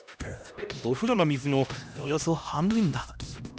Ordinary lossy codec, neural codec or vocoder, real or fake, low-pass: none; codec, 16 kHz, 1 kbps, X-Codec, HuBERT features, trained on LibriSpeech; fake; none